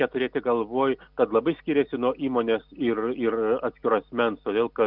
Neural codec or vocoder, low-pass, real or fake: none; 5.4 kHz; real